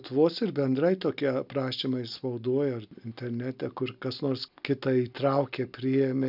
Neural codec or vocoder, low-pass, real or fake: none; 5.4 kHz; real